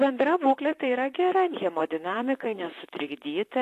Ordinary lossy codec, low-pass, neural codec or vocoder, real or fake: AAC, 64 kbps; 14.4 kHz; vocoder, 44.1 kHz, 128 mel bands, Pupu-Vocoder; fake